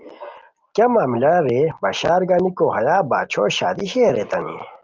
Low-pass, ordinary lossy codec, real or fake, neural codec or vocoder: 7.2 kHz; Opus, 32 kbps; real; none